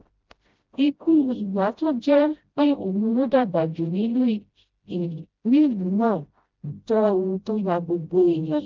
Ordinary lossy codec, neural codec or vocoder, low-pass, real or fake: Opus, 32 kbps; codec, 16 kHz, 0.5 kbps, FreqCodec, smaller model; 7.2 kHz; fake